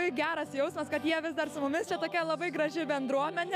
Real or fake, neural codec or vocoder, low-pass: fake; autoencoder, 48 kHz, 128 numbers a frame, DAC-VAE, trained on Japanese speech; 14.4 kHz